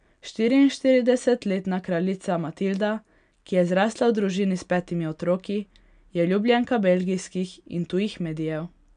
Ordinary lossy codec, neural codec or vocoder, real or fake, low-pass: none; none; real; 9.9 kHz